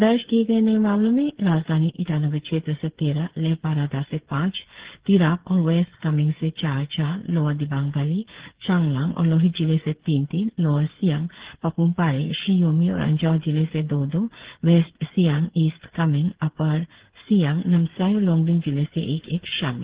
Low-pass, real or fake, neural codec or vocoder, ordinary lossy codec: 3.6 kHz; fake; codec, 16 kHz, 8 kbps, FreqCodec, smaller model; Opus, 16 kbps